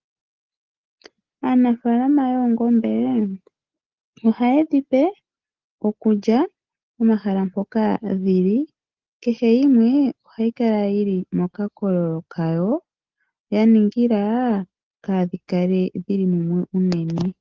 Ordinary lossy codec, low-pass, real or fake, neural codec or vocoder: Opus, 16 kbps; 7.2 kHz; real; none